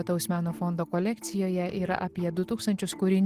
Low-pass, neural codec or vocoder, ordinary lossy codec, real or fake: 14.4 kHz; none; Opus, 16 kbps; real